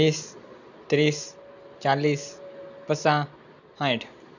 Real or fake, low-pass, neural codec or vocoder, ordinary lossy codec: real; 7.2 kHz; none; none